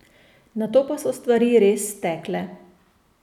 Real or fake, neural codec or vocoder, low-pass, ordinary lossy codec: real; none; 19.8 kHz; none